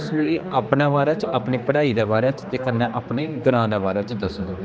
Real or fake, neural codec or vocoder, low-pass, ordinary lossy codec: fake; codec, 16 kHz, 2 kbps, X-Codec, HuBERT features, trained on general audio; none; none